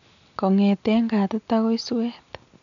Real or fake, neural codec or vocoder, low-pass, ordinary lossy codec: real; none; 7.2 kHz; none